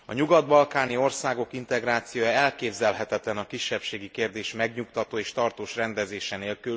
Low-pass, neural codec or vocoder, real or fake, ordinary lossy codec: none; none; real; none